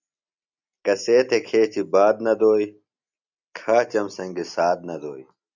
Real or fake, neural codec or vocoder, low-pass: real; none; 7.2 kHz